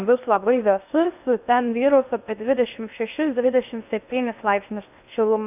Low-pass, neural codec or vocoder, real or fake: 3.6 kHz; codec, 16 kHz in and 24 kHz out, 0.6 kbps, FocalCodec, streaming, 2048 codes; fake